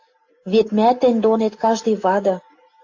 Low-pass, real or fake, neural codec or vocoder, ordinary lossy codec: 7.2 kHz; real; none; AAC, 48 kbps